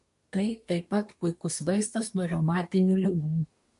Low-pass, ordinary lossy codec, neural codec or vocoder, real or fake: 10.8 kHz; MP3, 64 kbps; codec, 24 kHz, 1 kbps, SNAC; fake